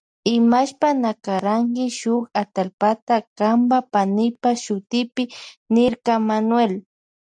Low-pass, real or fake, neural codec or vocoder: 9.9 kHz; real; none